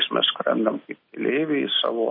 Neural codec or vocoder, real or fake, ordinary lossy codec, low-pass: none; real; MP3, 24 kbps; 5.4 kHz